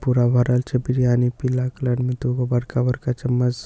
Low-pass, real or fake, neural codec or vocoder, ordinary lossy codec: none; real; none; none